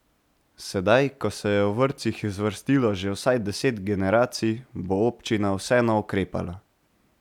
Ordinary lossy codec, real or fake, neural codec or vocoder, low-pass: none; real; none; 19.8 kHz